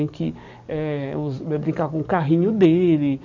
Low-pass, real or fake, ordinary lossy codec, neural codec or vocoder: 7.2 kHz; fake; none; codec, 44.1 kHz, 7.8 kbps, DAC